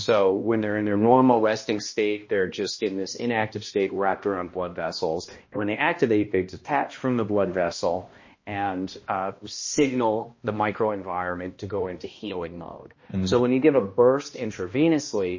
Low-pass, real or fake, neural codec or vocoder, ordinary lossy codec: 7.2 kHz; fake; codec, 16 kHz, 1 kbps, X-Codec, HuBERT features, trained on balanced general audio; MP3, 32 kbps